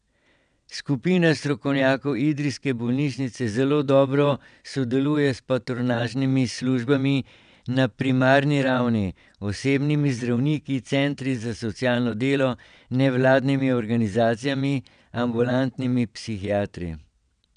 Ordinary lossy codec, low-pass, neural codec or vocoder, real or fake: none; 9.9 kHz; vocoder, 22.05 kHz, 80 mel bands, WaveNeXt; fake